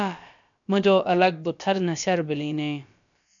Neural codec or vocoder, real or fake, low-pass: codec, 16 kHz, about 1 kbps, DyCAST, with the encoder's durations; fake; 7.2 kHz